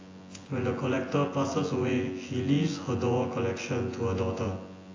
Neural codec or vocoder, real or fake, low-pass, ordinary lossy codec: vocoder, 24 kHz, 100 mel bands, Vocos; fake; 7.2 kHz; AAC, 32 kbps